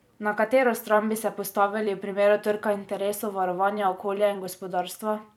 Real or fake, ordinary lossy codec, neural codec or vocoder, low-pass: fake; none; vocoder, 44.1 kHz, 128 mel bands every 256 samples, BigVGAN v2; 19.8 kHz